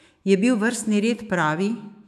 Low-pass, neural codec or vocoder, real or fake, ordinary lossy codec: 14.4 kHz; autoencoder, 48 kHz, 128 numbers a frame, DAC-VAE, trained on Japanese speech; fake; none